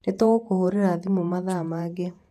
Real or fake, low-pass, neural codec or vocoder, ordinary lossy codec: fake; 14.4 kHz; vocoder, 48 kHz, 128 mel bands, Vocos; none